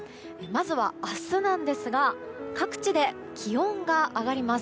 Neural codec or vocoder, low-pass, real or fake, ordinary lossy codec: none; none; real; none